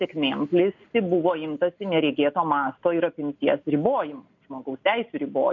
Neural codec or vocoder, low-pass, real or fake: none; 7.2 kHz; real